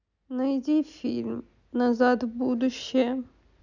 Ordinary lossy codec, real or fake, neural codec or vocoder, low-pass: none; real; none; 7.2 kHz